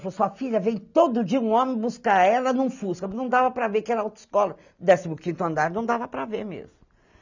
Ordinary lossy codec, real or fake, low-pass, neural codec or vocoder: none; real; 7.2 kHz; none